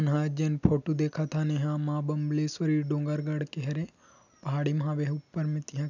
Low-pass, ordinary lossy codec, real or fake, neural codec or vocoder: 7.2 kHz; none; real; none